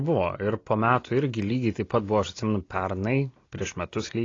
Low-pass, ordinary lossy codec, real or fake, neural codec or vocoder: 7.2 kHz; AAC, 32 kbps; real; none